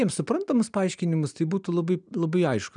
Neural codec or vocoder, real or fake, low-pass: none; real; 9.9 kHz